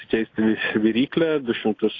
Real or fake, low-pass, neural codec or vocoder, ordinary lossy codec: real; 7.2 kHz; none; AAC, 32 kbps